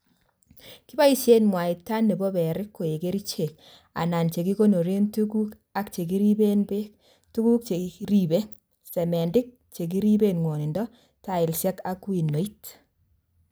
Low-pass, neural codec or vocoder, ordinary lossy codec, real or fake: none; none; none; real